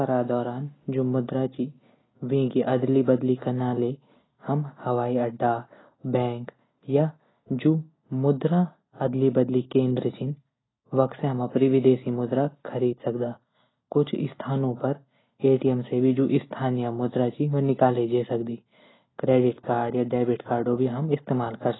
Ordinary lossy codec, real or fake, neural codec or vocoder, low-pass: AAC, 16 kbps; real; none; 7.2 kHz